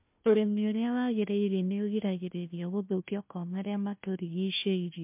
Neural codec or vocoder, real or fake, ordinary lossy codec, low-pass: codec, 16 kHz, 1 kbps, FunCodec, trained on Chinese and English, 50 frames a second; fake; MP3, 32 kbps; 3.6 kHz